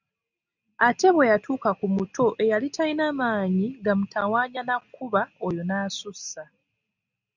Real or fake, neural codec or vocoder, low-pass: real; none; 7.2 kHz